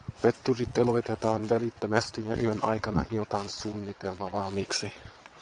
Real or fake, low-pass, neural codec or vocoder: fake; 9.9 kHz; vocoder, 22.05 kHz, 80 mel bands, WaveNeXt